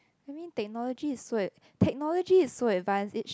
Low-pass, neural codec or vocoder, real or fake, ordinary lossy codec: none; none; real; none